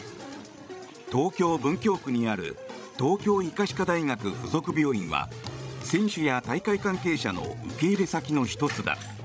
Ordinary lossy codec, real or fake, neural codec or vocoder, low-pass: none; fake; codec, 16 kHz, 16 kbps, FreqCodec, larger model; none